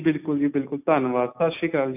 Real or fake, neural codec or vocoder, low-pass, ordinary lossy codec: real; none; 3.6 kHz; none